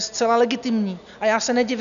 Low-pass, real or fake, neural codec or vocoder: 7.2 kHz; real; none